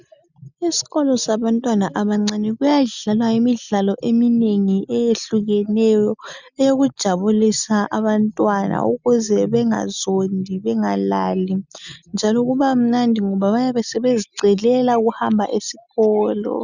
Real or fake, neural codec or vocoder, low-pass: real; none; 7.2 kHz